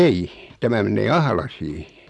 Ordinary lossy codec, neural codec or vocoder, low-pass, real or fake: none; none; none; real